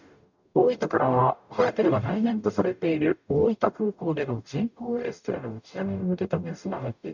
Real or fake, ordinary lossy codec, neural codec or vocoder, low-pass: fake; none; codec, 44.1 kHz, 0.9 kbps, DAC; 7.2 kHz